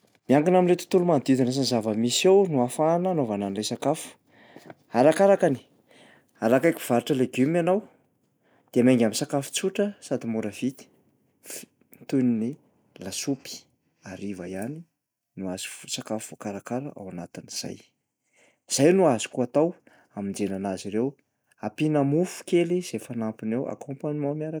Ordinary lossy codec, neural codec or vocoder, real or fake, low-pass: none; none; real; none